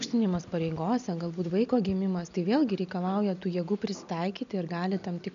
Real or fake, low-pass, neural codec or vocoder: real; 7.2 kHz; none